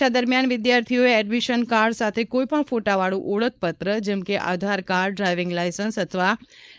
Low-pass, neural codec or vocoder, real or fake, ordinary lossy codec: none; codec, 16 kHz, 4.8 kbps, FACodec; fake; none